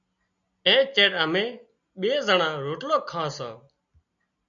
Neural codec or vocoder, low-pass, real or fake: none; 7.2 kHz; real